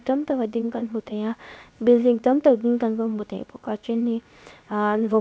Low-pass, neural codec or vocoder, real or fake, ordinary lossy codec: none; codec, 16 kHz, 0.7 kbps, FocalCodec; fake; none